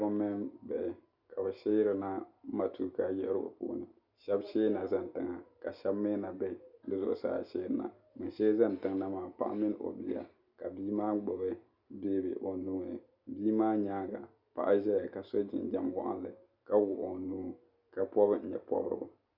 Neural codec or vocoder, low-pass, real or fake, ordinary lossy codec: none; 5.4 kHz; real; Opus, 64 kbps